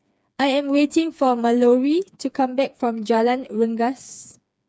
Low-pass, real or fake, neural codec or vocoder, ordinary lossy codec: none; fake; codec, 16 kHz, 4 kbps, FreqCodec, smaller model; none